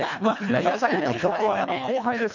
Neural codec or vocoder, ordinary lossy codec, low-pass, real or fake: codec, 24 kHz, 1.5 kbps, HILCodec; none; 7.2 kHz; fake